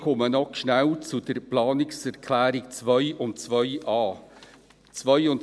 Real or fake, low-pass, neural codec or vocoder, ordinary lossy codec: real; none; none; none